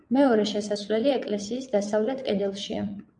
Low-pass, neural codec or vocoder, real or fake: 9.9 kHz; vocoder, 22.05 kHz, 80 mel bands, WaveNeXt; fake